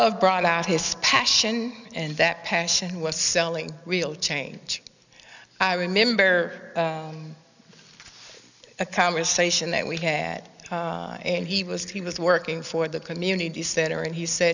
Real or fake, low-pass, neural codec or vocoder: real; 7.2 kHz; none